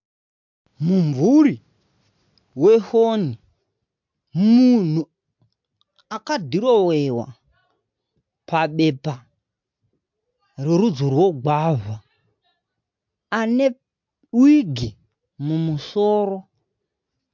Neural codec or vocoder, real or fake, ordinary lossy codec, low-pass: none; real; MP3, 64 kbps; 7.2 kHz